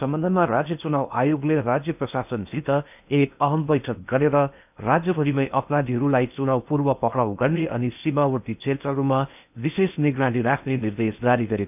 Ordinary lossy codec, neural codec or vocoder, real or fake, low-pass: none; codec, 16 kHz in and 24 kHz out, 0.8 kbps, FocalCodec, streaming, 65536 codes; fake; 3.6 kHz